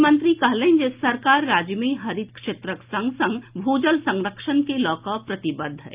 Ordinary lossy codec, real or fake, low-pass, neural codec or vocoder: Opus, 24 kbps; real; 3.6 kHz; none